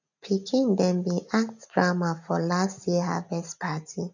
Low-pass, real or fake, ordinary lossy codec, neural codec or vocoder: 7.2 kHz; real; none; none